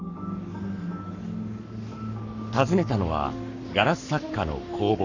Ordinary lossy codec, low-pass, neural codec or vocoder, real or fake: none; 7.2 kHz; codec, 44.1 kHz, 7.8 kbps, Pupu-Codec; fake